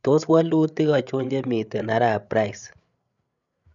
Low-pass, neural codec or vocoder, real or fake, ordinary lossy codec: 7.2 kHz; codec, 16 kHz, 8 kbps, FreqCodec, larger model; fake; none